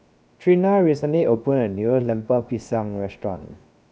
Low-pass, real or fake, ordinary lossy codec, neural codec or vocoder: none; fake; none; codec, 16 kHz, 0.7 kbps, FocalCodec